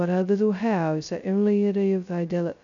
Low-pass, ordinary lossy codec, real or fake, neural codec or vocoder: 7.2 kHz; none; fake; codec, 16 kHz, 0.2 kbps, FocalCodec